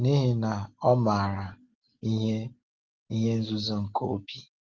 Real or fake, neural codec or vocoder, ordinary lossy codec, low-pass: real; none; Opus, 16 kbps; 7.2 kHz